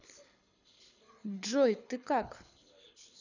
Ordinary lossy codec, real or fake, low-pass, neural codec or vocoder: none; fake; 7.2 kHz; codec, 24 kHz, 6 kbps, HILCodec